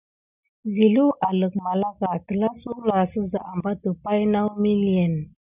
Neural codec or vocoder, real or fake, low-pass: none; real; 3.6 kHz